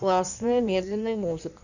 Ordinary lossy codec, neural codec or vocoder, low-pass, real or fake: none; codec, 16 kHz in and 24 kHz out, 1.1 kbps, FireRedTTS-2 codec; 7.2 kHz; fake